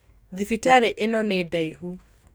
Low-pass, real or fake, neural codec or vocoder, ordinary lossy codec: none; fake; codec, 44.1 kHz, 2.6 kbps, DAC; none